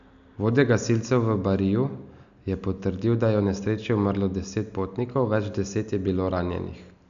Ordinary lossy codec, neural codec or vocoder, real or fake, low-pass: none; none; real; 7.2 kHz